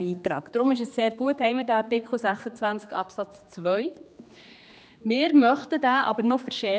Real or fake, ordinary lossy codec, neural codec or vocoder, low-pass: fake; none; codec, 16 kHz, 2 kbps, X-Codec, HuBERT features, trained on general audio; none